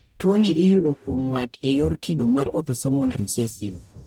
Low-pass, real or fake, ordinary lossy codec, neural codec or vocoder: 19.8 kHz; fake; none; codec, 44.1 kHz, 0.9 kbps, DAC